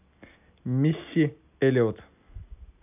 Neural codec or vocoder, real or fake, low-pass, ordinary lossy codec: none; real; 3.6 kHz; none